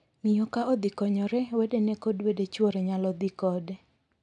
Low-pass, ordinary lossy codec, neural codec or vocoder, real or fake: 10.8 kHz; none; none; real